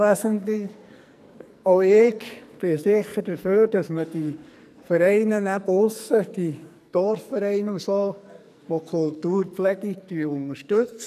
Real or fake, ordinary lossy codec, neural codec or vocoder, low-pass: fake; none; codec, 44.1 kHz, 2.6 kbps, SNAC; 14.4 kHz